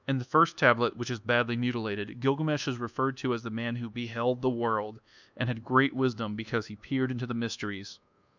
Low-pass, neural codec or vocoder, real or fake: 7.2 kHz; codec, 24 kHz, 1.2 kbps, DualCodec; fake